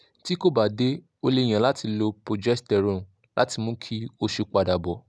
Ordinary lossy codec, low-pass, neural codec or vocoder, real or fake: none; none; none; real